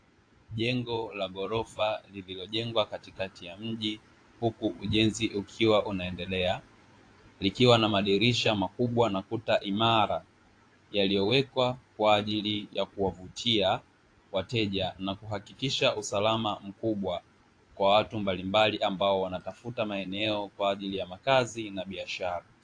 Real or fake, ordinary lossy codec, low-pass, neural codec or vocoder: fake; AAC, 48 kbps; 9.9 kHz; vocoder, 24 kHz, 100 mel bands, Vocos